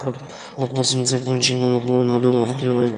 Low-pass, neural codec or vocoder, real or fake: 9.9 kHz; autoencoder, 22.05 kHz, a latent of 192 numbers a frame, VITS, trained on one speaker; fake